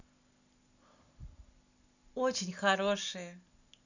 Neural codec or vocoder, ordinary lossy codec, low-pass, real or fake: none; none; 7.2 kHz; real